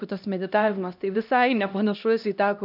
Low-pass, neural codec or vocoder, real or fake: 5.4 kHz; codec, 16 kHz, 1 kbps, X-Codec, HuBERT features, trained on LibriSpeech; fake